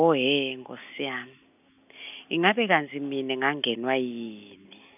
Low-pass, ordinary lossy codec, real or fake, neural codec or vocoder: 3.6 kHz; none; real; none